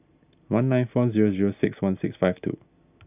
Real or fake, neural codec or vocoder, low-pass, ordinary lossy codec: real; none; 3.6 kHz; none